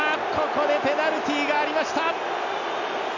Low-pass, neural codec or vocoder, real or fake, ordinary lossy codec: 7.2 kHz; none; real; none